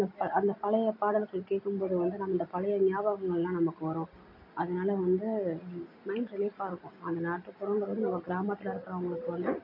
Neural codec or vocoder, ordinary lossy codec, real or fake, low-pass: none; MP3, 32 kbps; real; 5.4 kHz